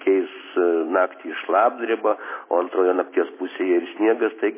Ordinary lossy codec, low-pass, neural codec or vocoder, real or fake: MP3, 16 kbps; 3.6 kHz; none; real